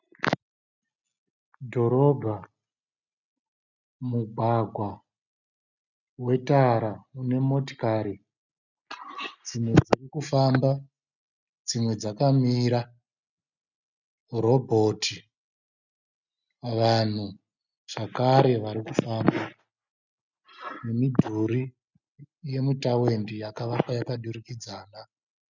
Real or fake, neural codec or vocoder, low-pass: real; none; 7.2 kHz